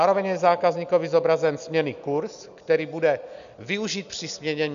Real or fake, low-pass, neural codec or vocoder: real; 7.2 kHz; none